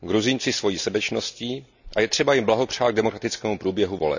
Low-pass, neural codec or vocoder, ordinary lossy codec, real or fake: 7.2 kHz; none; none; real